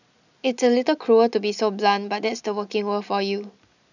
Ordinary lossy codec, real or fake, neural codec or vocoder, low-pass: none; real; none; 7.2 kHz